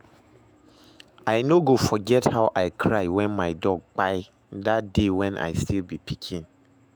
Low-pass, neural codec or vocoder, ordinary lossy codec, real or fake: none; autoencoder, 48 kHz, 128 numbers a frame, DAC-VAE, trained on Japanese speech; none; fake